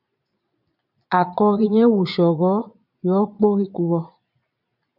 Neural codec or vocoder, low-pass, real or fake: vocoder, 22.05 kHz, 80 mel bands, Vocos; 5.4 kHz; fake